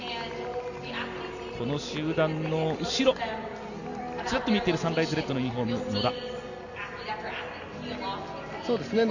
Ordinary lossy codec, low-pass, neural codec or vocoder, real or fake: none; 7.2 kHz; none; real